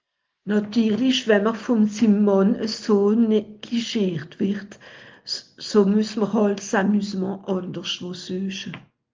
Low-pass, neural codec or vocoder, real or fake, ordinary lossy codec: 7.2 kHz; none; real; Opus, 32 kbps